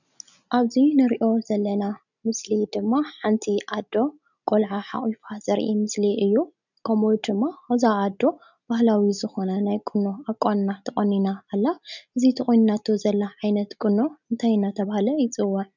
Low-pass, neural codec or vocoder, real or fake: 7.2 kHz; none; real